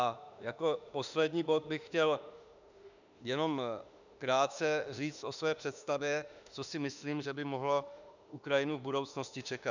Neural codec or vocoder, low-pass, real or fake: autoencoder, 48 kHz, 32 numbers a frame, DAC-VAE, trained on Japanese speech; 7.2 kHz; fake